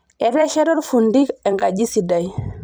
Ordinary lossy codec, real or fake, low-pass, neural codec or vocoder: none; fake; none; vocoder, 44.1 kHz, 128 mel bands every 256 samples, BigVGAN v2